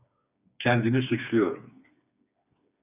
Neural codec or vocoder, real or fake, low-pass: codec, 16 kHz, 4 kbps, FreqCodec, smaller model; fake; 3.6 kHz